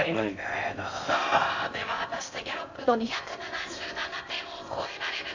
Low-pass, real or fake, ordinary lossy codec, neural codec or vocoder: 7.2 kHz; fake; AAC, 48 kbps; codec, 16 kHz in and 24 kHz out, 0.6 kbps, FocalCodec, streaming, 4096 codes